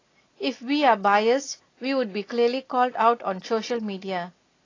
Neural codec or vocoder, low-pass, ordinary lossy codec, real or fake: none; 7.2 kHz; AAC, 32 kbps; real